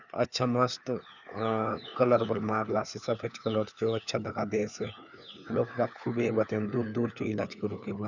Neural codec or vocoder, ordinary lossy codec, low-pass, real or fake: codec, 16 kHz, 4 kbps, FunCodec, trained on LibriTTS, 50 frames a second; none; 7.2 kHz; fake